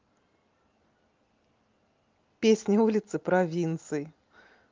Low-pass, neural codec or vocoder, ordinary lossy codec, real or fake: 7.2 kHz; none; Opus, 32 kbps; real